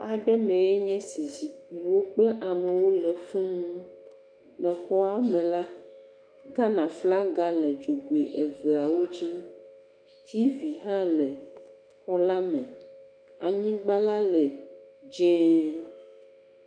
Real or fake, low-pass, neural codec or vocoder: fake; 9.9 kHz; autoencoder, 48 kHz, 32 numbers a frame, DAC-VAE, trained on Japanese speech